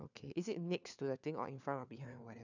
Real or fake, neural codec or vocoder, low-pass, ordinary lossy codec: fake; codec, 16 kHz, 4 kbps, FunCodec, trained on LibriTTS, 50 frames a second; 7.2 kHz; none